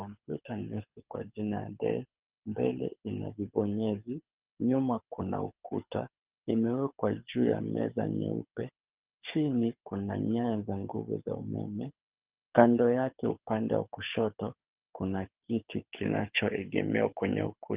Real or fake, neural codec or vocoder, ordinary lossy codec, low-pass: fake; codec, 24 kHz, 6 kbps, HILCodec; Opus, 32 kbps; 3.6 kHz